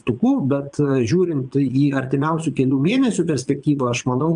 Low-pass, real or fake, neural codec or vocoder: 9.9 kHz; fake; vocoder, 22.05 kHz, 80 mel bands, Vocos